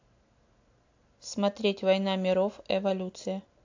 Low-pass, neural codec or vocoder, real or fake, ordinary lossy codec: 7.2 kHz; none; real; AAC, 48 kbps